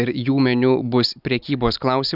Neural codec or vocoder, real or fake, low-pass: none; real; 5.4 kHz